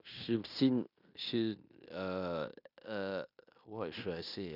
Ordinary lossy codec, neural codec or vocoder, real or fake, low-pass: none; codec, 16 kHz in and 24 kHz out, 0.9 kbps, LongCat-Audio-Codec, fine tuned four codebook decoder; fake; 5.4 kHz